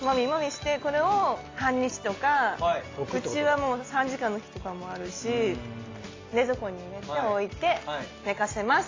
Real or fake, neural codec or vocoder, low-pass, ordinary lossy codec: real; none; 7.2 kHz; AAC, 32 kbps